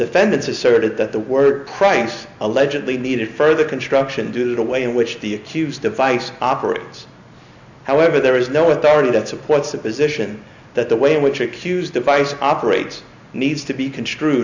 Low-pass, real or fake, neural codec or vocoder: 7.2 kHz; real; none